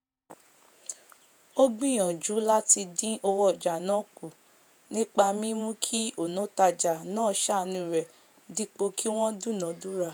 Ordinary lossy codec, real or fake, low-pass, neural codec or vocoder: none; fake; none; vocoder, 48 kHz, 128 mel bands, Vocos